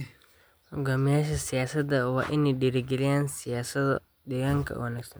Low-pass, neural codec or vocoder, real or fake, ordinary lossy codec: none; none; real; none